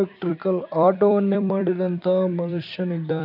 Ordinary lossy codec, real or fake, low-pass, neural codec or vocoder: none; fake; 5.4 kHz; vocoder, 44.1 kHz, 128 mel bands every 256 samples, BigVGAN v2